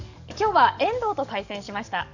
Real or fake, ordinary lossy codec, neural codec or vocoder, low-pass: fake; none; codec, 44.1 kHz, 7.8 kbps, DAC; 7.2 kHz